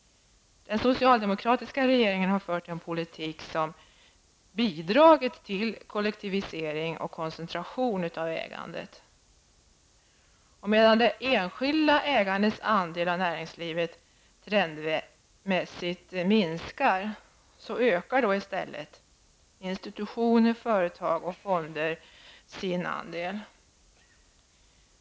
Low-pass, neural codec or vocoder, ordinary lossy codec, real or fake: none; none; none; real